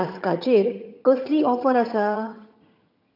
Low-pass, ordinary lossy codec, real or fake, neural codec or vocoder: 5.4 kHz; none; fake; vocoder, 22.05 kHz, 80 mel bands, HiFi-GAN